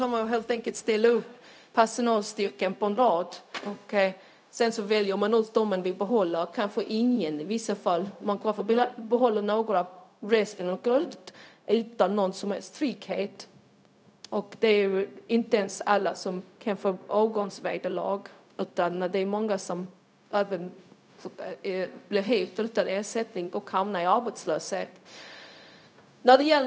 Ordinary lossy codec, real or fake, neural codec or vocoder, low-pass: none; fake; codec, 16 kHz, 0.4 kbps, LongCat-Audio-Codec; none